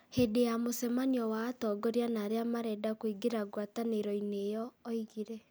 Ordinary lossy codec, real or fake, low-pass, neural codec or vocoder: none; real; none; none